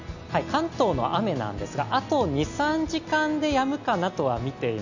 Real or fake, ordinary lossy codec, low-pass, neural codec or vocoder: real; none; 7.2 kHz; none